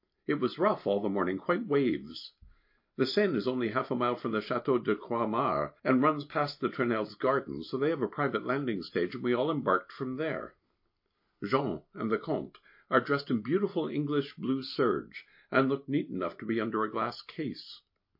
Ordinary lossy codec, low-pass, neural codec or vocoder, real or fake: MP3, 32 kbps; 5.4 kHz; none; real